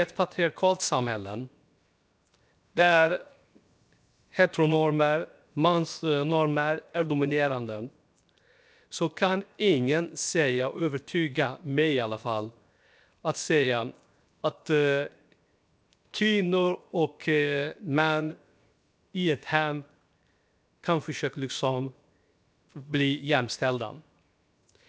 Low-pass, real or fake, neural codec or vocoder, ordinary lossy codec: none; fake; codec, 16 kHz, 0.7 kbps, FocalCodec; none